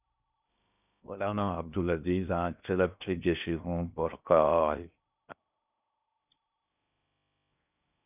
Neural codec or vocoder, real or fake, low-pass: codec, 16 kHz in and 24 kHz out, 0.6 kbps, FocalCodec, streaming, 2048 codes; fake; 3.6 kHz